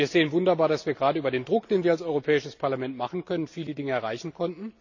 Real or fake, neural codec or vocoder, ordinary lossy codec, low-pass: real; none; none; 7.2 kHz